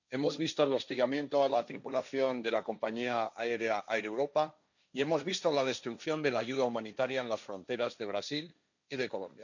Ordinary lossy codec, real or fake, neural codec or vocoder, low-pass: none; fake; codec, 16 kHz, 1.1 kbps, Voila-Tokenizer; 7.2 kHz